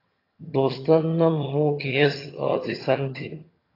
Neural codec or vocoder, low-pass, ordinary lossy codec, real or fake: vocoder, 22.05 kHz, 80 mel bands, HiFi-GAN; 5.4 kHz; AAC, 24 kbps; fake